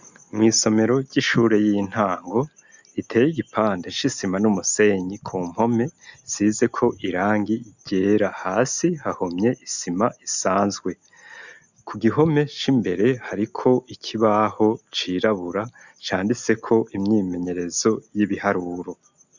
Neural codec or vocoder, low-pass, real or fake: none; 7.2 kHz; real